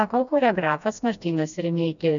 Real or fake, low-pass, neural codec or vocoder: fake; 7.2 kHz; codec, 16 kHz, 1 kbps, FreqCodec, smaller model